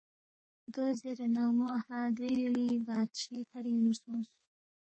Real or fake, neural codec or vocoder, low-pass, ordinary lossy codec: fake; codec, 32 kHz, 1.9 kbps, SNAC; 9.9 kHz; MP3, 32 kbps